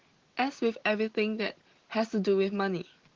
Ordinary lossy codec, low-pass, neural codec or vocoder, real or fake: Opus, 16 kbps; 7.2 kHz; none; real